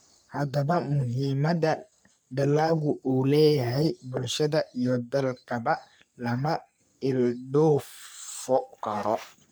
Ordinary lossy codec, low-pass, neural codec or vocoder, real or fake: none; none; codec, 44.1 kHz, 3.4 kbps, Pupu-Codec; fake